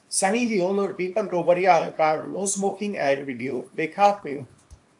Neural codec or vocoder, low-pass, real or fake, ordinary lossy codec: codec, 24 kHz, 0.9 kbps, WavTokenizer, small release; 10.8 kHz; fake; AAC, 64 kbps